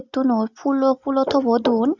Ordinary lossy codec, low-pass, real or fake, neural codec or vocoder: Opus, 64 kbps; 7.2 kHz; real; none